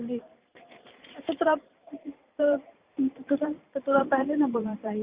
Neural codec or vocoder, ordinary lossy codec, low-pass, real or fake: vocoder, 44.1 kHz, 128 mel bands every 512 samples, BigVGAN v2; Opus, 64 kbps; 3.6 kHz; fake